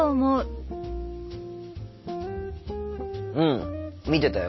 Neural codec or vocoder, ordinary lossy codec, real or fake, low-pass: none; MP3, 24 kbps; real; 7.2 kHz